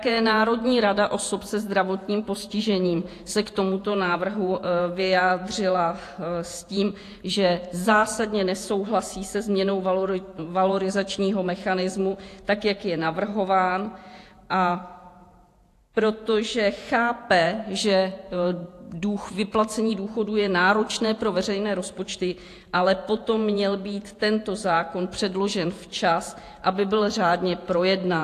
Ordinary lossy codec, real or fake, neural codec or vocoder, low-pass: AAC, 64 kbps; fake; vocoder, 48 kHz, 128 mel bands, Vocos; 14.4 kHz